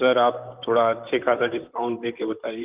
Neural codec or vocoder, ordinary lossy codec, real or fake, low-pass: vocoder, 44.1 kHz, 128 mel bands, Pupu-Vocoder; Opus, 32 kbps; fake; 3.6 kHz